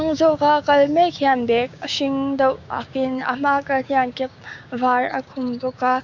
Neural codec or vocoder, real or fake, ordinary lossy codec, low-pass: codec, 16 kHz, 6 kbps, DAC; fake; none; 7.2 kHz